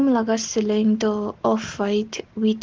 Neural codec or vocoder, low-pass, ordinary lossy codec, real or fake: none; 7.2 kHz; Opus, 16 kbps; real